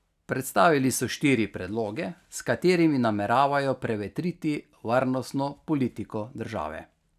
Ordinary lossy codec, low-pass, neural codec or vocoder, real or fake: none; 14.4 kHz; none; real